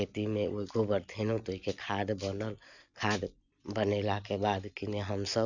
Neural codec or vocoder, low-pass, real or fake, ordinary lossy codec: none; 7.2 kHz; real; none